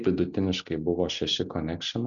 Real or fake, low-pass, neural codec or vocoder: real; 7.2 kHz; none